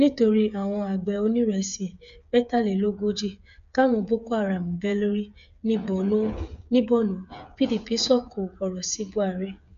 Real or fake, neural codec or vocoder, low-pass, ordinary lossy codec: fake; codec, 16 kHz, 8 kbps, FreqCodec, smaller model; 7.2 kHz; none